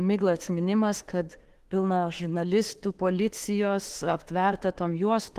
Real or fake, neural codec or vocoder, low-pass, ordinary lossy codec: fake; autoencoder, 48 kHz, 32 numbers a frame, DAC-VAE, trained on Japanese speech; 14.4 kHz; Opus, 16 kbps